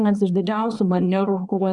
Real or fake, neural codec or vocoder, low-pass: fake; codec, 24 kHz, 0.9 kbps, WavTokenizer, small release; 10.8 kHz